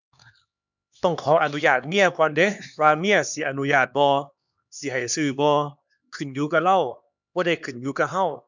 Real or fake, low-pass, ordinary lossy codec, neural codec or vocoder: fake; 7.2 kHz; none; codec, 16 kHz, 2 kbps, X-Codec, HuBERT features, trained on LibriSpeech